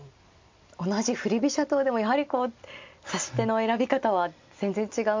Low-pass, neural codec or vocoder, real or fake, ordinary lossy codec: 7.2 kHz; none; real; MP3, 48 kbps